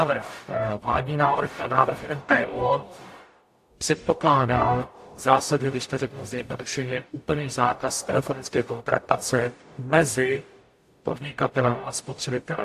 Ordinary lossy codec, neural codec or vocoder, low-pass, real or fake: AAC, 64 kbps; codec, 44.1 kHz, 0.9 kbps, DAC; 14.4 kHz; fake